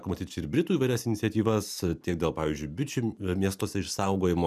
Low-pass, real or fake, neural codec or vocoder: 14.4 kHz; real; none